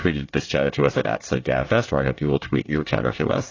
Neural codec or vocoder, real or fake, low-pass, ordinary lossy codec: codec, 24 kHz, 1 kbps, SNAC; fake; 7.2 kHz; AAC, 32 kbps